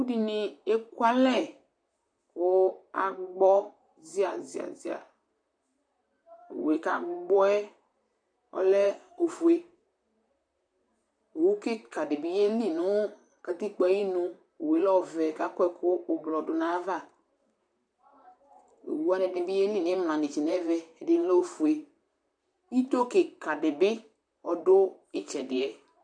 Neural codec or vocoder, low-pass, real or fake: vocoder, 44.1 kHz, 128 mel bands, Pupu-Vocoder; 9.9 kHz; fake